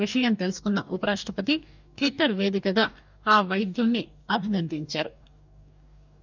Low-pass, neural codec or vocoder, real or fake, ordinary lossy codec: 7.2 kHz; codec, 44.1 kHz, 2.6 kbps, DAC; fake; none